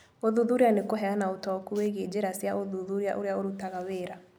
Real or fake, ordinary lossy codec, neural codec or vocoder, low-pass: real; none; none; none